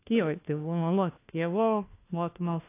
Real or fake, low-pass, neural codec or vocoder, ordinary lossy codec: fake; 3.6 kHz; codec, 16 kHz, 1 kbps, FunCodec, trained on LibriTTS, 50 frames a second; AAC, 24 kbps